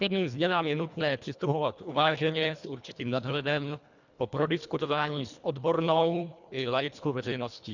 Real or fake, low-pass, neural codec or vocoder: fake; 7.2 kHz; codec, 24 kHz, 1.5 kbps, HILCodec